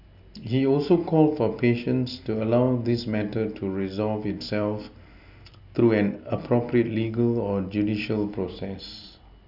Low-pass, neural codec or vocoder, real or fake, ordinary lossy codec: 5.4 kHz; none; real; none